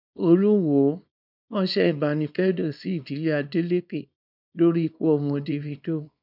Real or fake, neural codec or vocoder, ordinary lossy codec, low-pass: fake; codec, 24 kHz, 0.9 kbps, WavTokenizer, small release; none; 5.4 kHz